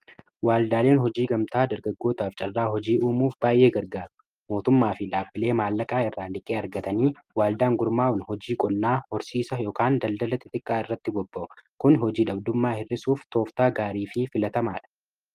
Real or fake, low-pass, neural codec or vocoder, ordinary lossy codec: real; 14.4 kHz; none; Opus, 32 kbps